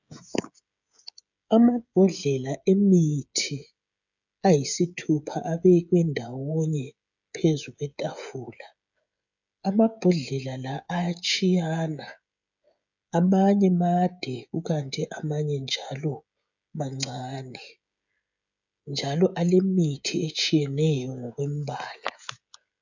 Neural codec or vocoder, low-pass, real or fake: codec, 16 kHz, 16 kbps, FreqCodec, smaller model; 7.2 kHz; fake